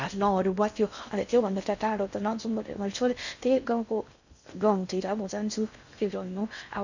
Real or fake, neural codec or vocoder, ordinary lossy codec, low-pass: fake; codec, 16 kHz in and 24 kHz out, 0.6 kbps, FocalCodec, streaming, 2048 codes; none; 7.2 kHz